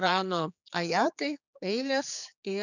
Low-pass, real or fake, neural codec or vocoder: 7.2 kHz; fake; codec, 16 kHz, 4 kbps, X-Codec, HuBERT features, trained on general audio